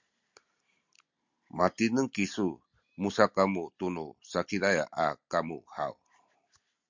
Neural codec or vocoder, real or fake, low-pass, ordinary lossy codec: none; real; 7.2 kHz; MP3, 64 kbps